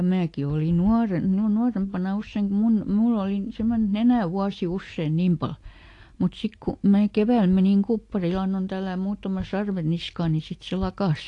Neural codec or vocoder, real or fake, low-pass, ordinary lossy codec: none; real; 10.8 kHz; AAC, 48 kbps